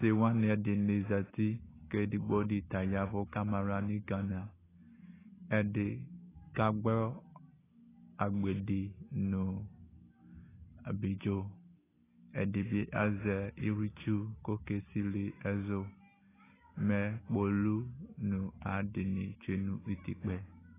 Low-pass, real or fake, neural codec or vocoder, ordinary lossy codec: 3.6 kHz; real; none; AAC, 16 kbps